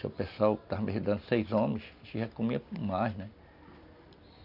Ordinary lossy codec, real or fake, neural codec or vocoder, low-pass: none; real; none; 5.4 kHz